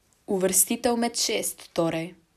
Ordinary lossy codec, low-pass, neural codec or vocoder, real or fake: none; 14.4 kHz; none; real